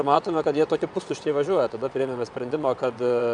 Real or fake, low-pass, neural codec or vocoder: fake; 9.9 kHz; vocoder, 22.05 kHz, 80 mel bands, WaveNeXt